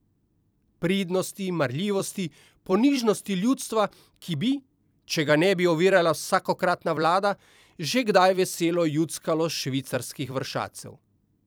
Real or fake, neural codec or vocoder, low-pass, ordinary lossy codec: fake; vocoder, 44.1 kHz, 128 mel bands every 256 samples, BigVGAN v2; none; none